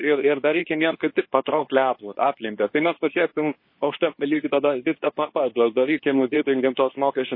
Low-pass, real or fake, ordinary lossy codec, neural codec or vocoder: 5.4 kHz; fake; MP3, 24 kbps; codec, 24 kHz, 0.9 kbps, WavTokenizer, medium speech release version 2